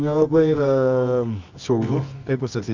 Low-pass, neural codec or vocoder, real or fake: 7.2 kHz; codec, 24 kHz, 0.9 kbps, WavTokenizer, medium music audio release; fake